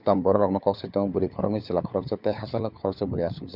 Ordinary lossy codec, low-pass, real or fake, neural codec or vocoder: Opus, 64 kbps; 5.4 kHz; fake; codec, 16 kHz, 4 kbps, FunCodec, trained on LibriTTS, 50 frames a second